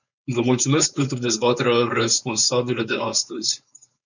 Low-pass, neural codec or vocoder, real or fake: 7.2 kHz; codec, 16 kHz, 4.8 kbps, FACodec; fake